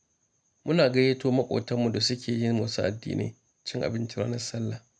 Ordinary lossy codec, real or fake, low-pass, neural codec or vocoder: none; real; none; none